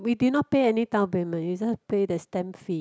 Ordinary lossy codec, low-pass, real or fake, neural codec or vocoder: none; none; real; none